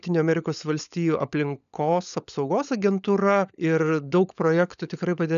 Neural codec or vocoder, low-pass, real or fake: codec, 16 kHz, 16 kbps, FunCodec, trained on LibriTTS, 50 frames a second; 7.2 kHz; fake